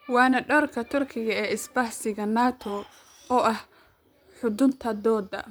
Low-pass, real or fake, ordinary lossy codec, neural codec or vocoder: none; fake; none; vocoder, 44.1 kHz, 128 mel bands, Pupu-Vocoder